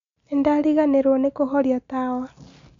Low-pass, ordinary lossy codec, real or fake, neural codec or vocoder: 7.2 kHz; MP3, 64 kbps; real; none